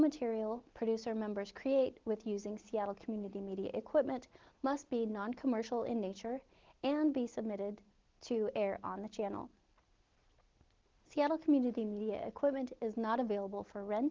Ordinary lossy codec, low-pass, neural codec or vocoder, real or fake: Opus, 16 kbps; 7.2 kHz; none; real